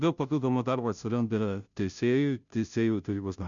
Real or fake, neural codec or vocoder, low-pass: fake; codec, 16 kHz, 0.5 kbps, FunCodec, trained on Chinese and English, 25 frames a second; 7.2 kHz